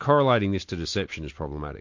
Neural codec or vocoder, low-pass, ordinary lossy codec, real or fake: none; 7.2 kHz; MP3, 48 kbps; real